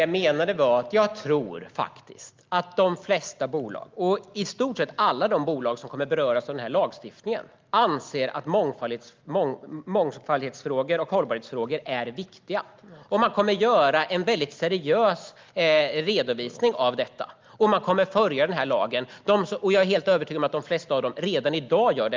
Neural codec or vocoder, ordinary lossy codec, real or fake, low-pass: none; Opus, 32 kbps; real; 7.2 kHz